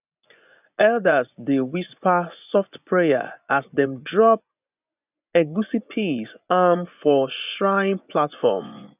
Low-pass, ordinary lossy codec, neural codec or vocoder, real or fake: 3.6 kHz; none; vocoder, 24 kHz, 100 mel bands, Vocos; fake